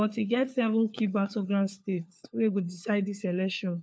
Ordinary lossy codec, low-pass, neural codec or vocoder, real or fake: none; none; codec, 16 kHz, 4 kbps, FunCodec, trained on LibriTTS, 50 frames a second; fake